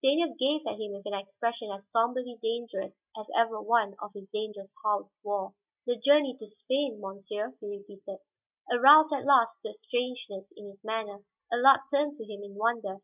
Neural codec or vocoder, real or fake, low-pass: none; real; 3.6 kHz